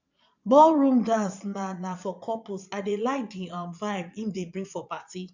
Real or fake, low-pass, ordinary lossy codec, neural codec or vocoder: fake; 7.2 kHz; none; vocoder, 22.05 kHz, 80 mel bands, Vocos